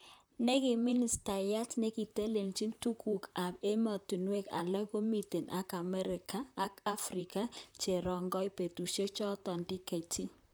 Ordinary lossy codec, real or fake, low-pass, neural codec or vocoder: none; fake; none; vocoder, 44.1 kHz, 128 mel bands, Pupu-Vocoder